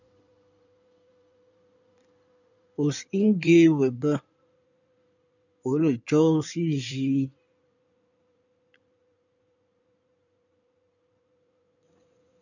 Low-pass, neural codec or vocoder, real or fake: 7.2 kHz; codec, 16 kHz in and 24 kHz out, 2.2 kbps, FireRedTTS-2 codec; fake